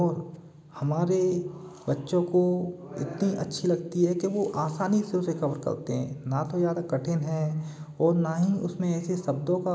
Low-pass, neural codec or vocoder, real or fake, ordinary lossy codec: none; none; real; none